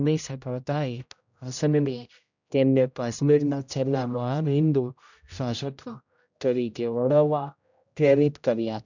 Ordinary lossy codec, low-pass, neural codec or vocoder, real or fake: none; 7.2 kHz; codec, 16 kHz, 0.5 kbps, X-Codec, HuBERT features, trained on general audio; fake